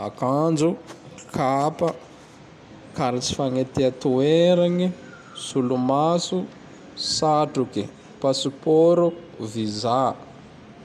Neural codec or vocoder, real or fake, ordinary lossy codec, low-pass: none; real; none; 14.4 kHz